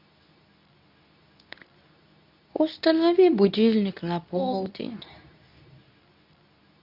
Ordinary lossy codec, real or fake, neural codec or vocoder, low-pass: none; fake; codec, 24 kHz, 0.9 kbps, WavTokenizer, medium speech release version 2; 5.4 kHz